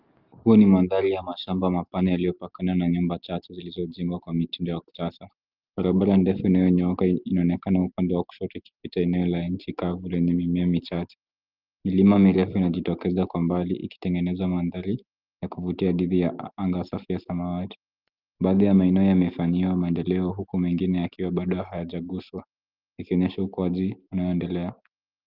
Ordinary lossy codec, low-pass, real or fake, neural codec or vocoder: Opus, 24 kbps; 5.4 kHz; real; none